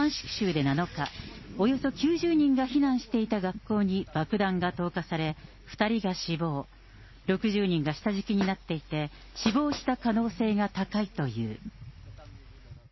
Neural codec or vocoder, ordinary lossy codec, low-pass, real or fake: none; MP3, 24 kbps; 7.2 kHz; real